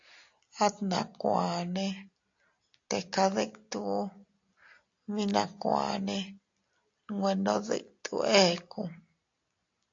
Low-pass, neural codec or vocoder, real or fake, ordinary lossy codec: 7.2 kHz; none; real; AAC, 48 kbps